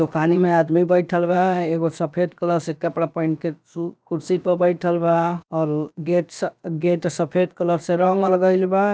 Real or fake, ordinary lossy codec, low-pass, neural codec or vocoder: fake; none; none; codec, 16 kHz, about 1 kbps, DyCAST, with the encoder's durations